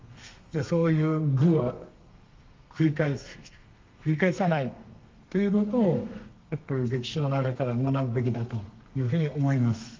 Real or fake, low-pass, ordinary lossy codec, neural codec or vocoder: fake; 7.2 kHz; Opus, 32 kbps; codec, 32 kHz, 1.9 kbps, SNAC